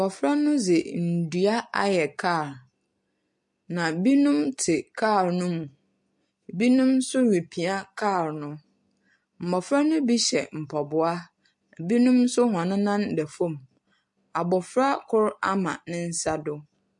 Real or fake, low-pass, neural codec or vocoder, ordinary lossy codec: real; 10.8 kHz; none; MP3, 48 kbps